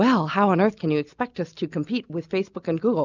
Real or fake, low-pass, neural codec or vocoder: real; 7.2 kHz; none